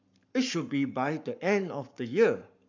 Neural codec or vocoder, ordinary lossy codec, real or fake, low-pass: codec, 44.1 kHz, 7.8 kbps, Pupu-Codec; none; fake; 7.2 kHz